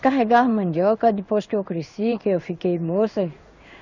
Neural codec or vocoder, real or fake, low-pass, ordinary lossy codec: codec, 16 kHz in and 24 kHz out, 1 kbps, XY-Tokenizer; fake; 7.2 kHz; none